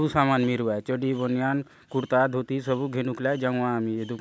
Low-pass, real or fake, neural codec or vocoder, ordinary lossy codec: none; real; none; none